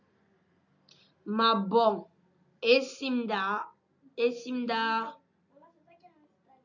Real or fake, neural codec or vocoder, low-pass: real; none; 7.2 kHz